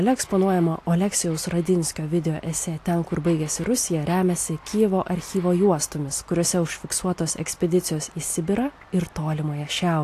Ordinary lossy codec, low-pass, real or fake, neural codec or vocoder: AAC, 64 kbps; 14.4 kHz; real; none